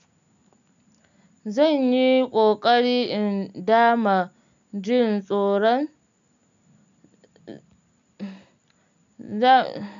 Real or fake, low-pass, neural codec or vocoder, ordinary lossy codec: real; 7.2 kHz; none; none